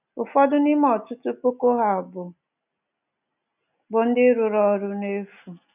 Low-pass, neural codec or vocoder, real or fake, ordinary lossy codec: 3.6 kHz; none; real; none